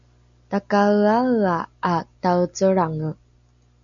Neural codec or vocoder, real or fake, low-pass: none; real; 7.2 kHz